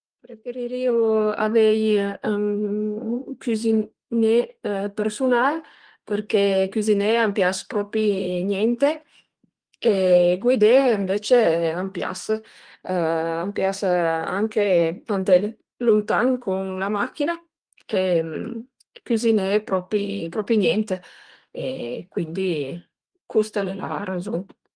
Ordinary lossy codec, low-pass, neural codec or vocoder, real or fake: Opus, 24 kbps; 9.9 kHz; codec, 32 kHz, 1.9 kbps, SNAC; fake